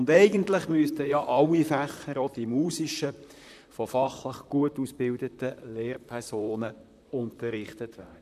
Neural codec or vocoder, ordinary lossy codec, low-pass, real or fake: vocoder, 44.1 kHz, 128 mel bands, Pupu-Vocoder; MP3, 96 kbps; 14.4 kHz; fake